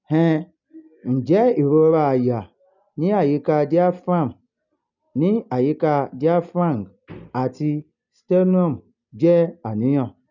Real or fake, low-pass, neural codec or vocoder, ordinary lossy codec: real; 7.2 kHz; none; none